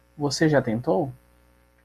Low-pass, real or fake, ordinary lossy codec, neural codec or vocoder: 14.4 kHz; real; MP3, 96 kbps; none